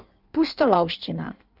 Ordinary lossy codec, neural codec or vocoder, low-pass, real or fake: none; codec, 16 kHz in and 24 kHz out, 1.1 kbps, FireRedTTS-2 codec; 5.4 kHz; fake